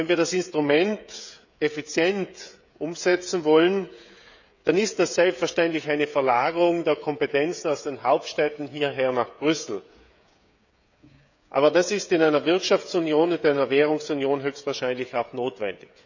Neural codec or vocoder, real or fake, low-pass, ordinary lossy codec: codec, 16 kHz, 16 kbps, FreqCodec, smaller model; fake; 7.2 kHz; none